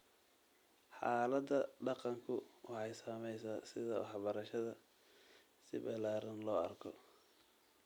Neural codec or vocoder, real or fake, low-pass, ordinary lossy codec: vocoder, 44.1 kHz, 128 mel bands every 256 samples, BigVGAN v2; fake; none; none